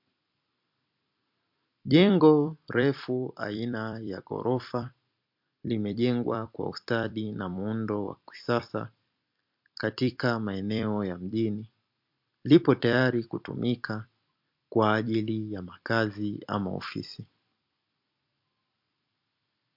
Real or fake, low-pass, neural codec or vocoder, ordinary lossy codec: fake; 5.4 kHz; vocoder, 44.1 kHz, 128 mel bands every 256 samples, BigVGAN v2; MP3, 48 kbps